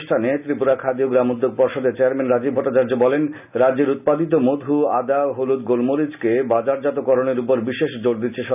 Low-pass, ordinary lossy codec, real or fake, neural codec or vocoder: 3.6 kHz; none; real; none